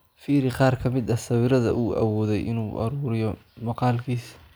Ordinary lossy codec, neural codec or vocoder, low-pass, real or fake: none; none; none; real